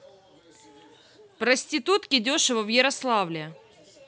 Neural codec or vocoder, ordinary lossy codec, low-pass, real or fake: none; none; none; real